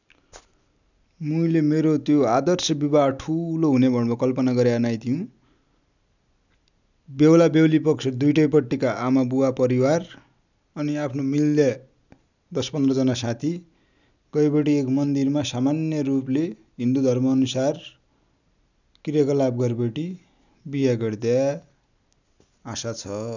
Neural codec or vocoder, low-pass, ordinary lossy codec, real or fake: none; 7.2 kHz; none; real